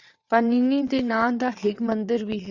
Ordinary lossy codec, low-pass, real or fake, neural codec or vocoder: Opus, 64 kbps; 7.2 kHz; fake; vocoder, 22.05 kHz, 80 mel bands, WaveNeXt